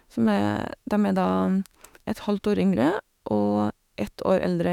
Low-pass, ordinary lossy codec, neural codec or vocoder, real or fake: 19.8 kHz; none; autoencoder, 48 kHz, 32 numbers a frame, DAC-VAE, trained on Japanese speech; fake